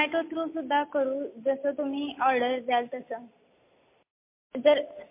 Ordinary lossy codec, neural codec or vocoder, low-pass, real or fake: MP3, 24 kbps; none; 3.6 kHz; real